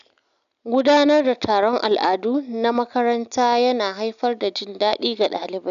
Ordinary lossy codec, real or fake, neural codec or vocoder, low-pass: none; real; none; 7.2 kHz